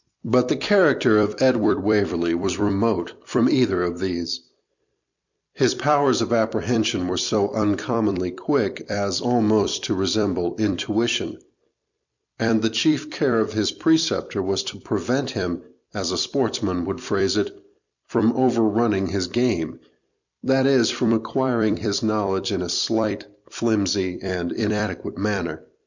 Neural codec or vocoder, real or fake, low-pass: vocoder, 44.1 kHz, 128 mel bands every 256 samples, BigVGAN v2; fake; 7.2 kHz